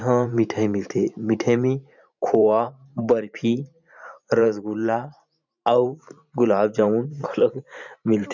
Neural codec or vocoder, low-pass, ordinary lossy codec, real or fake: vocoder, 44.1 kHz, 128 mel bands every 512 samples, BigVGAN v2; 7.2 kHz; none; fake